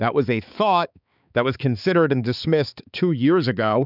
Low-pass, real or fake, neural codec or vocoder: 5.4 kHz; fake; codec, 16 kHz, 4 kbps, X-Codec, HuBERT features, trained on balanced general audio